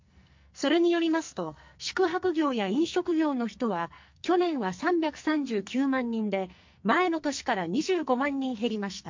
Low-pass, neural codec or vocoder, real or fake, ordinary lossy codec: 7.2 kHz; codec, 44.1 kHz, 2.6 kbps, SNAC; fake; MP3, 48 kbps